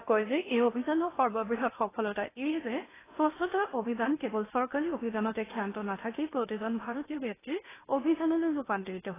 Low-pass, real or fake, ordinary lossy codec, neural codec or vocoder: 3.6 kHz; fake; AAC, 16 kbps; codec, 16 kHz in and 24 kHz out, 0.8 kbps, FocalCodec, streaming, 65536 codes